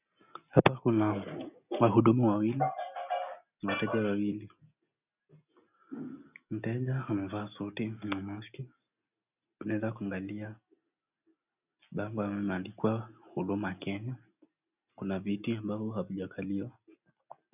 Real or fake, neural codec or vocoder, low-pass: real; none; 3.6 kHz